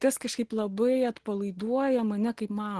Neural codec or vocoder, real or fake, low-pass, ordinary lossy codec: none; real; 10.8 kHz; Opus, 16 kbps